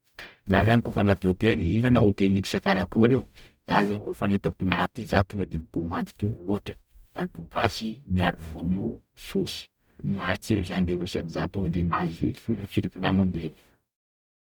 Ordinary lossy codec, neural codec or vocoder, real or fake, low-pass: none; codec, 44.1 kHz, 0.9 kbps, DAC; fake; none